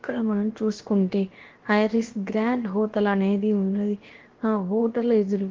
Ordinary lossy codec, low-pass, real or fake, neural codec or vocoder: Opus, 16 kbps; 7.2 kHz; fake; codec, 16 kHz, about 1 kbps, DyCAST, with the encoder's durations